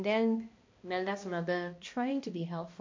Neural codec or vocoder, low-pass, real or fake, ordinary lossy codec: codec, 16 kHz, 1 kbps, X-Codec, HuBERT features, trained on balanced general audio; 7.2 kHz; fake; MP3, 48 kbps